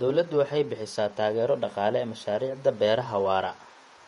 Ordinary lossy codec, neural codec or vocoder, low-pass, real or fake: MP3, 48 kbps; vocoder, 48 kHz, 128 mel bands, Vocos; 19.8 kHz; fake